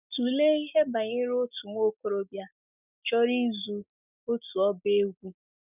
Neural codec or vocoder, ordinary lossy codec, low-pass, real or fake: none; none; 3.6 kHz; real